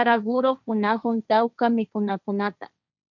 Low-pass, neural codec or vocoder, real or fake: 7.2 kHz; codec, 16 kHz, 1.1 kbps, Voila-Tokenizer; fake